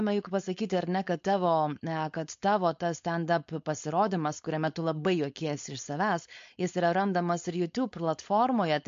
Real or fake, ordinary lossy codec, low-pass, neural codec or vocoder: fake; MP3, 48 kbps; 7.2 kHz; codec, 16 kHz, 4.8 kbps, FACodec